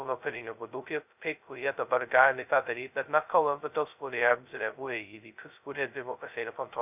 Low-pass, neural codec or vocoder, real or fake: 3.6 kHz; codec, 16 kHz, 0.2 kbps, FocalCodec; fake